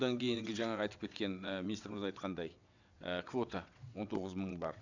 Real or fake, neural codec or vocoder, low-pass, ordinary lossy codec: fake; vocoder, 22.05 kHz, 80 mel bands, WaveNeXt; 7.2 kHz; none